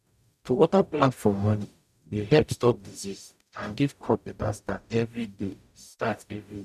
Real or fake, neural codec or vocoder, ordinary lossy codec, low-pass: fake; codec, 44.1 kHz, 0.9 kbps, DAC; none; 14.4 kHz